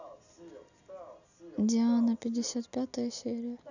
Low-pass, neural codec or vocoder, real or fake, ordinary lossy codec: 7.2 kHz; none; real; none